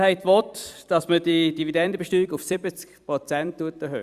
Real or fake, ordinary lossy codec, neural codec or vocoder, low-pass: fake; Opus, 64 kbps; vocoder, 44.1 kHz, 128 mel bands every 256 samples, BigVGAN v2; 14.4 kHz